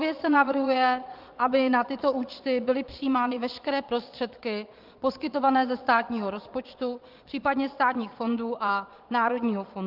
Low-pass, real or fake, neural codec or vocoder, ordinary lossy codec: 5.4 kHz; fake; vocoder, 22.05 kHz, 80 mel bands, Vocos; Opus, 24 kbps